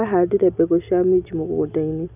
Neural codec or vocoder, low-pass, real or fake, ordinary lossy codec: none; 3.6 kHz; real; none